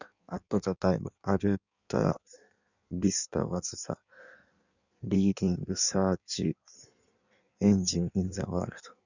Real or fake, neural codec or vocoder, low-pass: fake; codec, 16 kHz in and 24 kHz out, 1.1 kbps, FireRedTTS-2 codec; 7.2 kHz